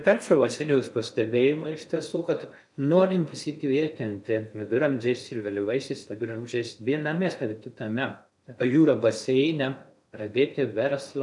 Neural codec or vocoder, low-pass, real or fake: codec, 16 kHz in and 24 kHz out, 0.6 kbps, FocalCodec, streaming, 4096 codes; 10.8 kHz; fake